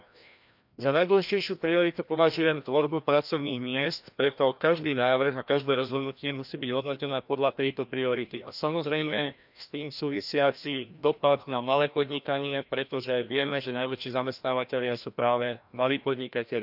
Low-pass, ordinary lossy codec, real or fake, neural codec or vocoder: 5.4 kHz; none; fake; codec, 16 kHz, 1 kbps, FreqCodec, larger model